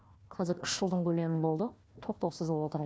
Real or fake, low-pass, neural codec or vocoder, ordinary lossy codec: fake; none; codec, 16 kHz, 1 kbps, FunCodec, trained on Chinese and English, 50 frames a second; none